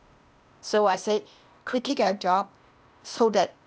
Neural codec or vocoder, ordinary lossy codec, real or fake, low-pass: codec, 16 kHz, 0.8 kbps, ZipCodec; none; fake; none